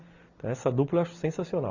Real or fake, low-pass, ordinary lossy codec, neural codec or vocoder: real; 7.2 kHz; none; none